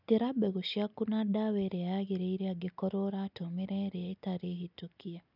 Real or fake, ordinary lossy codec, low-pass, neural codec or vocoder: real; none; 5.4 kHz; none